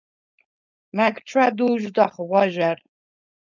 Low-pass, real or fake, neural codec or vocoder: 7.2 kHz; fake; codec, 16 kHz, 4.8 kbps, FACodec